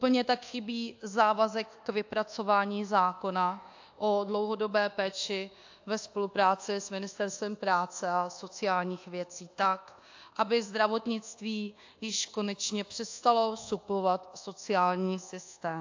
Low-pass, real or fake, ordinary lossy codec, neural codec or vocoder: 7.2 kHz; fake; AAC, 48 kbps; codec, 24 kHz, 1.2 kbps, DualCodec